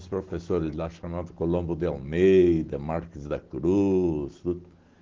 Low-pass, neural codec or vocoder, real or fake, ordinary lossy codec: 7.2 kHz; none; real; Opus, 16 kbps